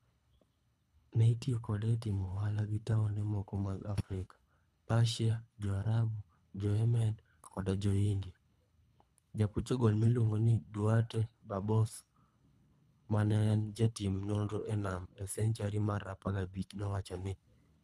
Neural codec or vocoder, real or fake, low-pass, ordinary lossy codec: codec, 24 kHz, 3 kbps, HILCodec; fake; none; none